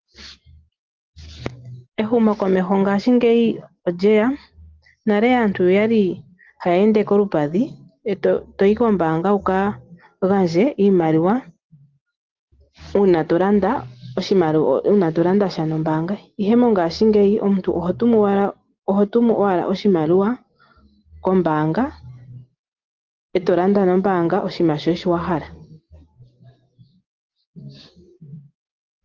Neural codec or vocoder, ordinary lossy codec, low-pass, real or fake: none; Opus, 16 kbps; 7.2 kHz; real